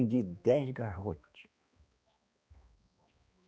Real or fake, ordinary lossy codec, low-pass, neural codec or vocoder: fake; none; none; codec, 16 kHz, 2 kbps, X-Codec, HuBERT features, trained on balanced general audio